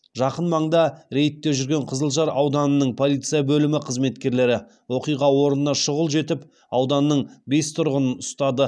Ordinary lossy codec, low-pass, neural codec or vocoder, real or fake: none; none; none; real